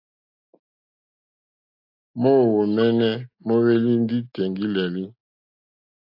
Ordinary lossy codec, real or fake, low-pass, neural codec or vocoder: AAC, 48 kbps; real; 5.4 kHz; none